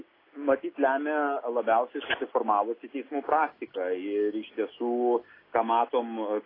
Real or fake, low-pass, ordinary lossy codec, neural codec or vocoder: real; 5.4 kHz; AAC, 24 kbps; none